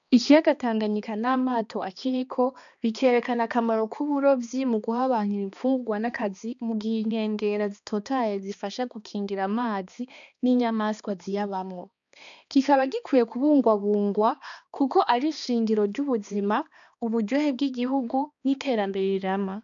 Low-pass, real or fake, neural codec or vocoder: 7.2 kHz; fake; codec, 16 kHz, 2 kbps, X-Codec, HuBERT features, trained on balanced general audio